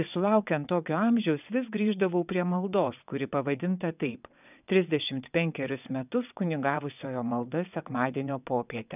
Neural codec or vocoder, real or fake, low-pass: vocoder, 22.05 kHz, 80 mel bands, WaveNeXt; fake; 3.6 kHz